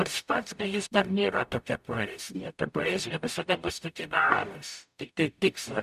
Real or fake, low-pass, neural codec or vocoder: fake; 14.4 kHz; codec, 44.1 kHz, 0.9 kbps, DAC